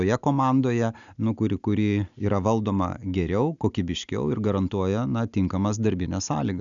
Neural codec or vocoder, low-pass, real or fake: none; 7.2 kHz; real